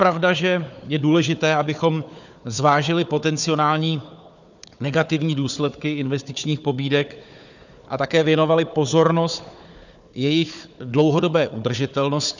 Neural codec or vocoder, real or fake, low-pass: codec, 16 kHz, 4 kbps, FunCodec, trained on Chinese and English, 50 frames a second; fake; 7.2 kHz